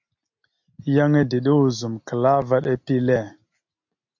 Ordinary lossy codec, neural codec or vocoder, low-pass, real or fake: MP3, 48 kbps; none; 7.2 kHz; real